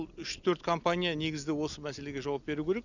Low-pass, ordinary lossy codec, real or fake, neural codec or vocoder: 7.2 kHz; none; real; none